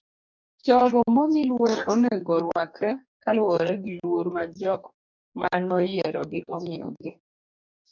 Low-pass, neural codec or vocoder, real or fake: 7.2 kHz; codec, 44.1 kHz, 2.6 kbps, DAC; fake